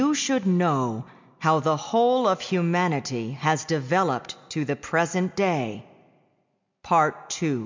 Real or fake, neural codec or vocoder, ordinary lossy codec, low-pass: real; none; MP3, 64 kbps; 7.2 kHz